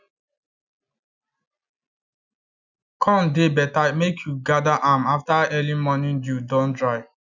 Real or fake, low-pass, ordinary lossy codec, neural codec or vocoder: real; 7.2 kHz; none; none